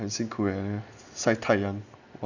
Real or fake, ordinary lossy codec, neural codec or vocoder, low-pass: real; none; none; 7.2 kHz